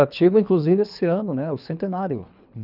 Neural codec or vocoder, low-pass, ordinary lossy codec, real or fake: codec, 24 kHz, 3 kbps, HILCodec; 5.4 kHz; none; fake